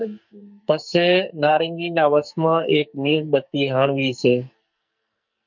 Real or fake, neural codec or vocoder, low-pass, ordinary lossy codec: fake; codec, 44.1 kHz, 2.6 kbps, SNAC; 7.2 kHz; MP3, 48 kbps